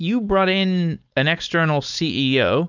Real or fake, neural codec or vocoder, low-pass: fake; codec, 16 kHz, 4.8 kbps, FACodec; 7.2 kHz